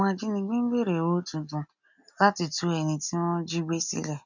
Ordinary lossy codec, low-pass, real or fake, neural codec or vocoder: none; 7.2 kHz; real; none